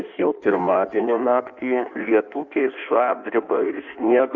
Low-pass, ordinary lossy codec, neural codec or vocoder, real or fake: 7.2 kHz; Opus, 64 kbps; codec, 16 kHz in and 24 kHz out, 1.1 kbps, FireRedTTS-2 codec; fake